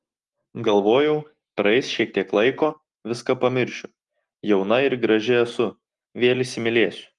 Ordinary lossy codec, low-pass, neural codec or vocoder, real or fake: Opus, 32 kbps; 10.8 kHz; none; real